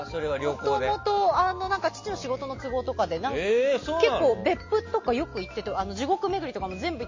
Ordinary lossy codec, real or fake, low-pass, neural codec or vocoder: MP3, 32 kbps; real; 7.2 kHz; none